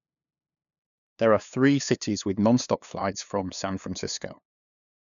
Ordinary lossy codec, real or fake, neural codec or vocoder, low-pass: none; fake; codec, 16 kHz, 2 kbps, FunCodec, trained on LibriTTS, 25 frames a second; 7.2 kHz